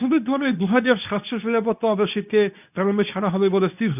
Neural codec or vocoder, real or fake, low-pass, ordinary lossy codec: codec, 24 kHz, 0.9 kbps, WavTokenizer, medium speech release version 2; fake; 3.6 kHz; none